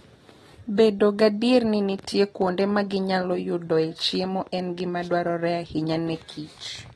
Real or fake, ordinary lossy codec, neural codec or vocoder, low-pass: real; AAC, 32 kbps; none; 19.8 kHz